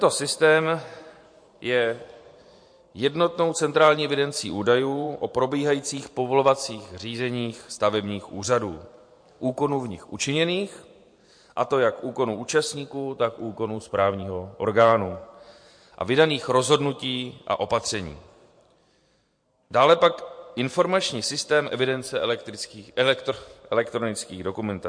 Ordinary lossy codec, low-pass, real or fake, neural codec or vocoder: MP3, 48 kbps; 9.9 kHz; fake; vocoder, 44.1 kHz, 128 mel bands every 512 samples, BigVGAN v2